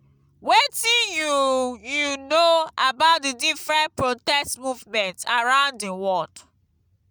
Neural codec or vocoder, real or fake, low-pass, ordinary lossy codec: none; real; none; none